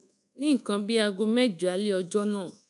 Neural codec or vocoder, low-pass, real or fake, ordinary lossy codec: codec, 24 kHz, 1.2 kbps, DualCodec; 10.8 kHz; fake; none